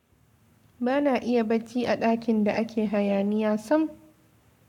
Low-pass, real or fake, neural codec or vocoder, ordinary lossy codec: 19.8 kHz; fake; codec, 44.1 kHz, 7.8 kbps, Pupu-Codec; none